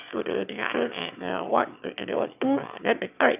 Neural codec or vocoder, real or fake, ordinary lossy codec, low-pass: autoencoder, 22.05 kHz, a latent of 192 numbers a frame, VITS, trained on one speaker; fake; none; 3.6 kHz